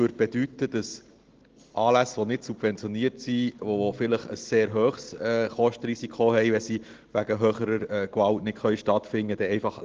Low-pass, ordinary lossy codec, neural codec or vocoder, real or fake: 7.2 kHz; Opus, 16 kbps; none; real